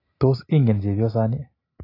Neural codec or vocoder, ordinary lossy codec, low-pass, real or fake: none; AAC, 24 kbps; 5.4 kHz; real